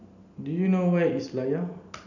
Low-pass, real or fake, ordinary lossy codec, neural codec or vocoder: 7.2 kHz; real; none; none